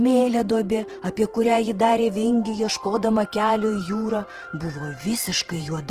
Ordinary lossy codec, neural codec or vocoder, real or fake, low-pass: Opus, 16 kbps; vocoder, 48 kHz, 128 mel bands, Vocos; fake; 14.4 kHz